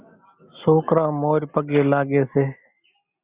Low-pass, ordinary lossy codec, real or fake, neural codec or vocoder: 3.6 kHz; Opus, 64 kbps; real; none